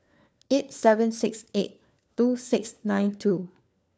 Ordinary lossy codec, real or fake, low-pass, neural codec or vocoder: none; fake; none; codec, 16 kHz, 4 kbps, FunCodec, trained on LibriTTS, 50 frames a second